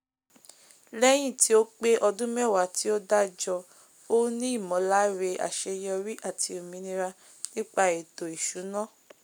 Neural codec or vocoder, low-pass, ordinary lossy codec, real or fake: none; none; none; real